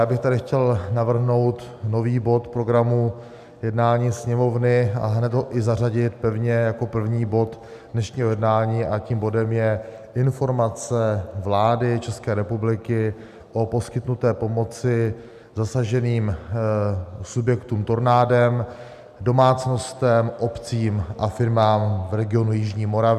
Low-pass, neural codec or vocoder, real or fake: 14.4 kHz; none; real